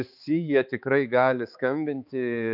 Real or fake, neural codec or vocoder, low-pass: fake; codec, 16 kHz, 4 kbps, X-Codec, HuBERT features, trained on balanced general audio; 5.4 kHz